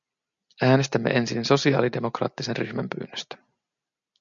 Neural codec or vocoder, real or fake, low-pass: none; real; 7.2 kHz